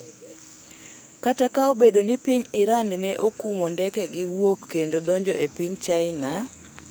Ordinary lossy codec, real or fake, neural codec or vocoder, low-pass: none; fake; codec, 44.1 kHz, 2.6 kbps, SNAC; none